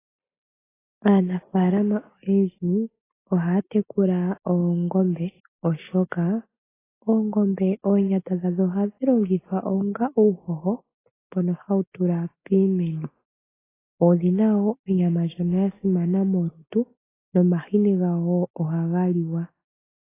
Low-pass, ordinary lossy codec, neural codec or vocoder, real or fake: 3.6 kHz; AAC, 16 kbps; none; real